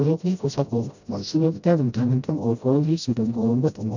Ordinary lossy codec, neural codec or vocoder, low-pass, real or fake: none; codec, 16 kHz, 0.5 kbps, FreqCodec, smaller model; 7.2 kHz; fake